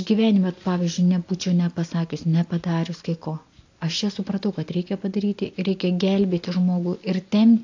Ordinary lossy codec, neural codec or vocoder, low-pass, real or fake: AAC, 48 kbps; none; 7.2 kHz; real